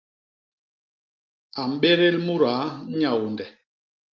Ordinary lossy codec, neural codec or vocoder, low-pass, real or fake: Opus, 32 kbps; none; 7.2 kHz; real